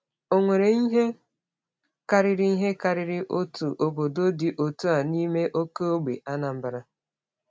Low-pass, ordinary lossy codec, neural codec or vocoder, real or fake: none; none; none; real